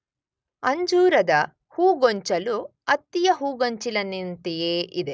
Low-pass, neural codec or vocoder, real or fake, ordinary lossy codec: none; none; real; none